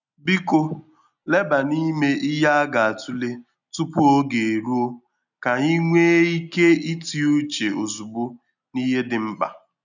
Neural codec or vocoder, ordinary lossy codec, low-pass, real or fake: none; none; 7.2 kHz; real